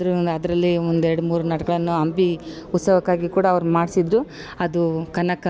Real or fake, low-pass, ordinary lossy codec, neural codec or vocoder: real; none; none; none